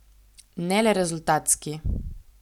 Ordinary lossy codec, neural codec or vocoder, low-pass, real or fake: none; none; 19.8 kHz; real